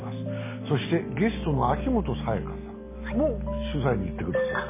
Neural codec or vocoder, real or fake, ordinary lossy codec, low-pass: none; real; MP3, 16 kbps; 3.6 kHz